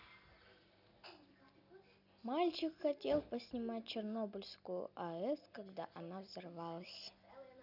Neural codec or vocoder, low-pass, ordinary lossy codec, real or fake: none; 5.4 kHz; none; real